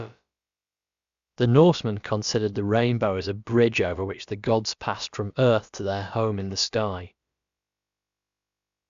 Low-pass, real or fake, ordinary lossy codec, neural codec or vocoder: 7.2 kHz; fake; Opus, 64 kbps; codec, 16 kHz, about 1 kbps, DyCAST, with the encoder's durations